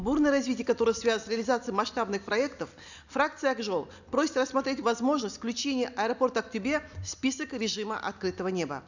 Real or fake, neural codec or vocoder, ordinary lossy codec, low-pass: real; none; none; 7.2 kHz